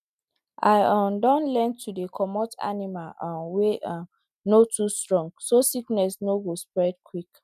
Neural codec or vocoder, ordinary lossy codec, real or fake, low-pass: none; none; real; 14.4 kHz